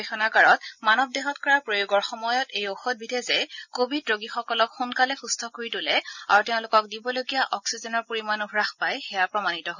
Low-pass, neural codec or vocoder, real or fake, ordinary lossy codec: 7.2 kHz; none; real; none